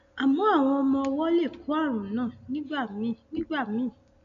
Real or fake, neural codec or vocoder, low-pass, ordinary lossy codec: real; none; 7.2 kHz; MP3, 96 kbps